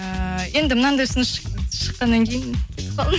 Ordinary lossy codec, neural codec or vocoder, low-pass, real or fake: none; none; none; real